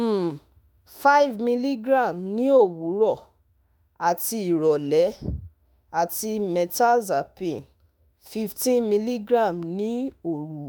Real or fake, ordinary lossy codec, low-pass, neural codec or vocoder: fake; none; none; autoencoder, 48 kHz, 32 numbers a frame, DAC-VAE, trained on Japanese speech